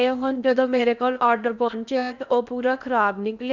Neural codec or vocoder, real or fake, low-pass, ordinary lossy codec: codec, 16 kHz in and 24 kHz out, 0.8 kbps, FocalCodec, streaming, 65536 codes; fake; 7.2 kHz; none